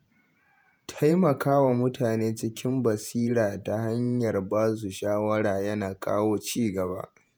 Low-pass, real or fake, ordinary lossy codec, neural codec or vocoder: none; fake; none; vocoder, 48 kHz, 128 mel bands, Vocos